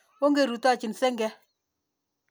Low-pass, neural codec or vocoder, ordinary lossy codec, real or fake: none; none; none; real